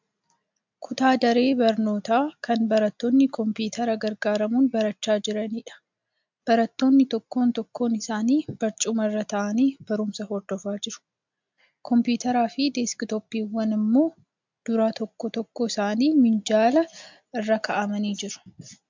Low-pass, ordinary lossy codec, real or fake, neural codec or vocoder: 7.2 kHz; MP3, 64 kbps; real; none